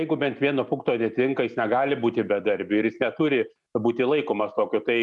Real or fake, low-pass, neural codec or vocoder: real; 10.8 kHz; none